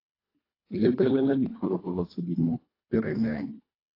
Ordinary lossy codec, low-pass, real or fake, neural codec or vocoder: AAC, 24 kbps; 5.4 kHz; fake; codec, 24 kHz, 1.5 kbps, HILCodec